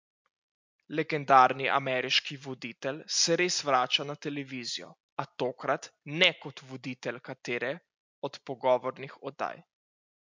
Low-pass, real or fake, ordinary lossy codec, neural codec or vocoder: 7.2 kHz; real; MP3, 64 kbps; none